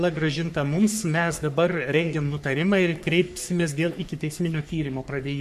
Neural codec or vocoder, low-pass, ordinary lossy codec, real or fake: codec, 44.1 kHz, 3.4 kbps, Pupu-Codec; 14.4 kHz; MP3, 96 kbps; fake